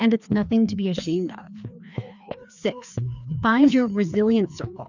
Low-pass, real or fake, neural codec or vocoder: 7.2 kHz; fake; codec, 16 kHz, 2 kbps, FreqCodec, larger model